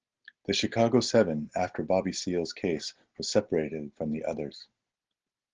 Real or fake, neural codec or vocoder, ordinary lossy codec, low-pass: real; none; Opus, 16 kbps; 7.2 kHz